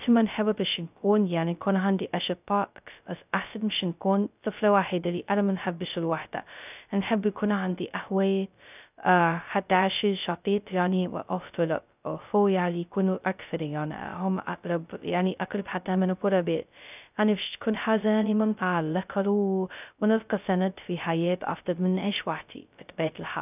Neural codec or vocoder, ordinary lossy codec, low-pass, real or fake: codec, 16 kHz, 0.2 kbps, FocalCodec; none; 3.6 kHz; fake